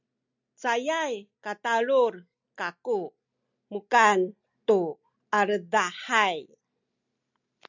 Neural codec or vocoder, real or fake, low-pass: none; real; 7.2 kHz